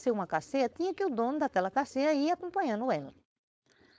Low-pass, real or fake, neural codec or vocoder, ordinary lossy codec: none; fake; codec, 16 kHz, 4.8 kbps, FACodec; none